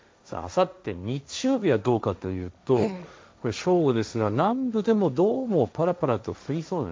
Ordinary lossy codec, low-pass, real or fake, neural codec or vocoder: none; none; fake; codec, 16 kHz, 1.1 kbps, Voila-Tokenizer